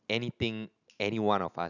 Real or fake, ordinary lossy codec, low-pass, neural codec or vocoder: real; none; 7.2 kHz; none